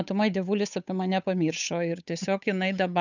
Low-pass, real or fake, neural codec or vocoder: 7.2 kHz; fake; codec, 16 kHz, 8 kbps, FunCodec, trained on Chinese and English, 25 frames a second